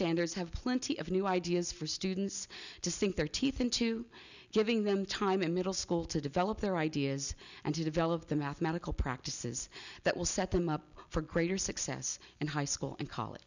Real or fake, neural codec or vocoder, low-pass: real; none; 7.2 kHz